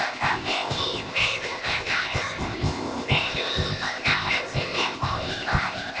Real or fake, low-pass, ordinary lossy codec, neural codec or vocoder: fake; none; none; codec, 16 kHz, 0.8 kbps, ZipCodec